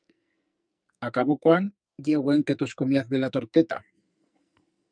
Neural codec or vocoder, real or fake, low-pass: codec, 44.1 kHz, 2.6 kbps, SNAC; fake; 9.9 kHz